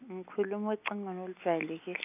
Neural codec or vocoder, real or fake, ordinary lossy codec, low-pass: none; real; none; 3.6 kHz